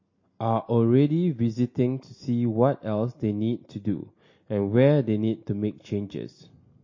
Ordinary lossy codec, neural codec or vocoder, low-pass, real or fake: MP3, 32 kbps; none; 7.2 kHz; real